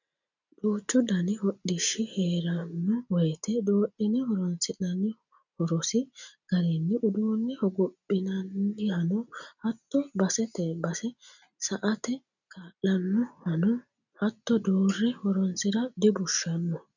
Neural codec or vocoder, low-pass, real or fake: none; 7.2 kHz; real